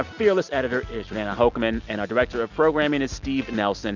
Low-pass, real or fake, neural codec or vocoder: 7.2 kHz; real; none